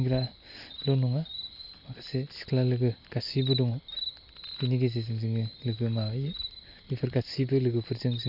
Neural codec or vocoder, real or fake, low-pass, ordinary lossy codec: none; real; 5.4 kHz; none